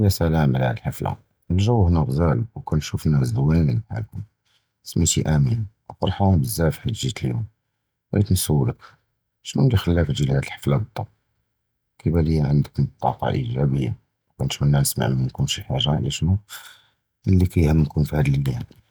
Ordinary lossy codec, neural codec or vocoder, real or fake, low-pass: none; none; real; none